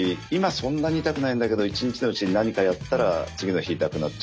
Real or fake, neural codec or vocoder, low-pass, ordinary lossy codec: real; none; none; none